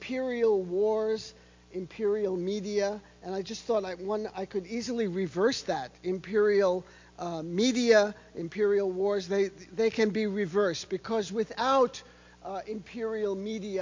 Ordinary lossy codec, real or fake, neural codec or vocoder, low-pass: MP3, 48 kbps; real; none; 7.2 kHz